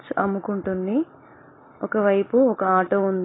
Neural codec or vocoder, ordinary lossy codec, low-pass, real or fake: none; AAC, 16 kbps; 7.2 kHz; real